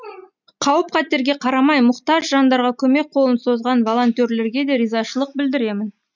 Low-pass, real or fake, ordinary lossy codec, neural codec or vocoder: 7.2 kHz; real; none; none